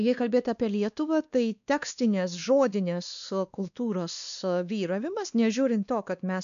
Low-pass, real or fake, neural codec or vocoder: 7.2 kHz; fake; codec, 16 kHz, 2 kbps, X-Codec, WavLM features, trained on Multilingual LibriSpeech